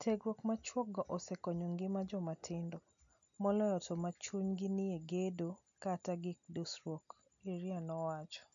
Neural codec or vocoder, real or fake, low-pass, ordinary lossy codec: none; real; 7.2 kHz; MP3, 64 kbps